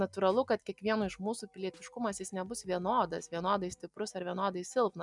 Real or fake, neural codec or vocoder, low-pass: real; none; 10.8 kHz